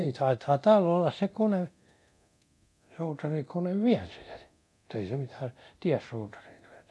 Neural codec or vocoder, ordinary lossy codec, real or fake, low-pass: codec, 24 kHz, 0.9 kbps, DualCodec; none; fake; none